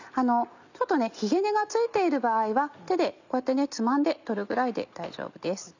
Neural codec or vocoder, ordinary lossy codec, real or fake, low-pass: none; none; real; 7.2 kHz